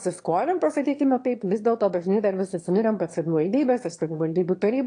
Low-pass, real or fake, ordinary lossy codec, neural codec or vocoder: 9.9 kHz; fake; AAC, 48 kbps; autoencoder, 22.05 kHz, a latent of 192 numbers a frame, VITS, trained on one speaker